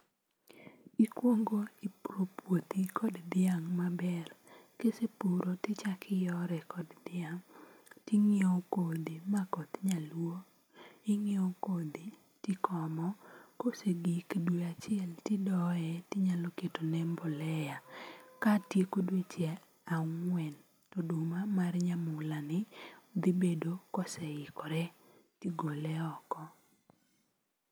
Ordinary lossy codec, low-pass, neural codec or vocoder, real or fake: none; none; none; real